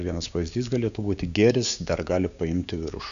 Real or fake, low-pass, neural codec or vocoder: fake; 7.2 kHz; codec, 16 kHz, 6 kbps, DAC